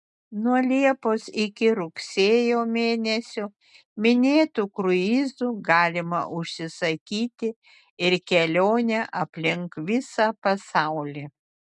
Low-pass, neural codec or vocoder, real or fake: 10.8 kHz; none; real